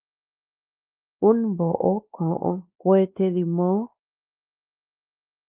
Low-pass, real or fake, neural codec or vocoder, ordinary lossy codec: 3.6 kHz; fake; codec, 16 kHz, 2 kbps, X-Codec, WavLM features, trained on Multilingual LibriSpeech; Opus, 24 kbps